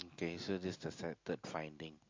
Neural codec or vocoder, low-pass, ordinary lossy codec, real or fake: none; 7.2 kHz; MP3, 48 kbps; real